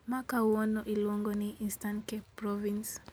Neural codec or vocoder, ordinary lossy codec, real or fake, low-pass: none; none; real; none